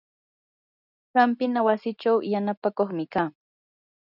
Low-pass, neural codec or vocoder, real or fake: 5.4 kHz; none; real